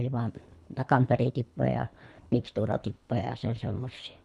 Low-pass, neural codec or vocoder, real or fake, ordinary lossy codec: none; codec, 24 kHz, 3 kbps, HILCodec; fake; none